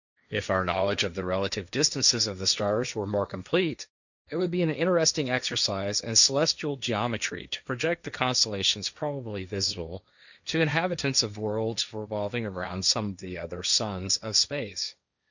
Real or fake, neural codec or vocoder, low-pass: fake; codec, 16 kHz, 1.1 kbps, Voila-Tokenizer; 7.2 kHz